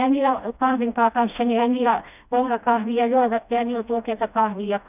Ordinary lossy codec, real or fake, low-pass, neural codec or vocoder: none; fake; 3.6 kHz; codec, 16 kHz, 1 kbps, FreqCodec, smaller model